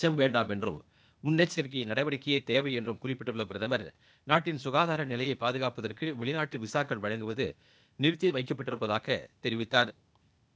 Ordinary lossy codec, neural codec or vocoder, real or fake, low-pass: none; codec, 16 kHz, 0.8 kbps, ZipCodec; fake; none